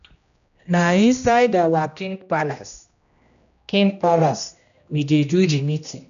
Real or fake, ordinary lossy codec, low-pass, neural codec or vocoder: fake; none; 7.2 kHz; codec, 16 kHz, 1 kbps, X-Codec, HuBERT features, trained on general audio